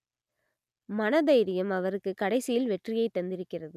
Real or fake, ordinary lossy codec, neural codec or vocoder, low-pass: real; none; none; 14.4 kHz